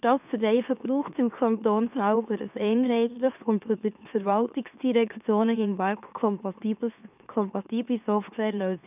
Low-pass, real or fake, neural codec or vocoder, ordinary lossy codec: 3.6 kHz; fake; autoencoder, 44.1 kHz, a latent of 192 numbers a frame, MeloTTS; none